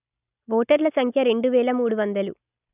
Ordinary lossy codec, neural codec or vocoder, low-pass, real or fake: none; none; 3.6 kHz; real